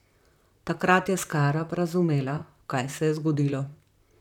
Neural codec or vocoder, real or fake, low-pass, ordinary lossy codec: vocoder, 44.1 kHz, 128 mel bands, Pupu-Vocoder; fake; 19.8 kHz; none